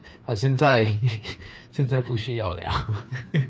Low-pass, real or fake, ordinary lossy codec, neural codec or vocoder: none; fake; none; codec, 16 kHz, 2 kbps, FreqCodec, larger model